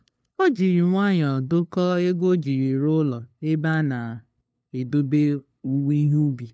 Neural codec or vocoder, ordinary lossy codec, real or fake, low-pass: codec, 16 kHz, 2 kbps, FunCodec, trained on LibriTTS, 25 frames a second; none; fake; none